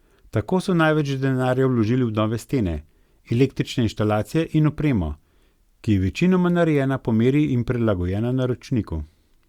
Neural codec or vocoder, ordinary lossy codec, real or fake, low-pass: none; Opus, 64 kbps; real; 19.8 kHz